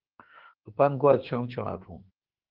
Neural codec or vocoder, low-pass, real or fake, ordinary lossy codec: autoencoder, 48 kHz, 32 numbers a frame, DAC-VAE, trained on Japanese speech; 5.4 kHz; fake; Opus, 16 kbps